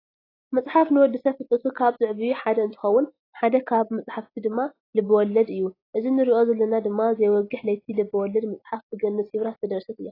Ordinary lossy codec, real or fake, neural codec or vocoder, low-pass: AAC, 24 kbps; real; none; 5.4 kHz